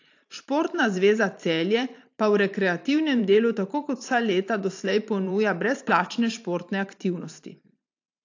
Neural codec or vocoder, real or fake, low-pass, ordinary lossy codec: vocoder, 44.1 kHz, 128 mel bands every 512 samples, BigVGAN v2; fake; 7.2 kHz; AAC, 48 kbps